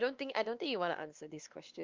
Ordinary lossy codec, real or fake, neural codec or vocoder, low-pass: Opus, 24 kbps; fake; codec, 16 kHz, 2 kbps, X-Codec, WavLM features, trained on Multilingual LibriSpeech; 7.2 kHz